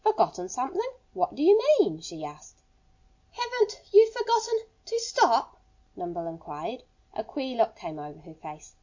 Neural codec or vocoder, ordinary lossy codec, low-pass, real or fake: none; MP3, 48 kbps; 7.2 kHz; real